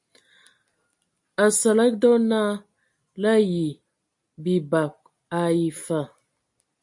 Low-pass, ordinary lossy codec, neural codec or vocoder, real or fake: 10.8 kHz; MP3, 48 kbps; none; real